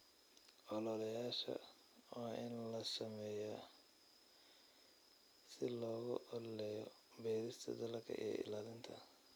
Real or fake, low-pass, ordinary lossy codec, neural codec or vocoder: real; none; none; none